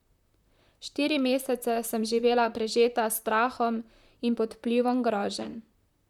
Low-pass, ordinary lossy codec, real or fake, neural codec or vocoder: 19.8 kHz; none; fake; vocoder, 44.1 kHz, 128 mel bands, Pupu-Vocoder